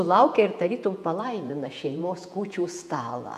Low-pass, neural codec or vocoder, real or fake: 14.4 kHz; vocoder, 48 kHz, 128 mel bands, Vocos; fake